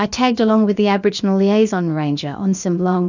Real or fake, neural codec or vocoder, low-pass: fake; codec, 16 kHz, about 1 kbps, DyCAST, with the encoder's durations; 7.2 kHz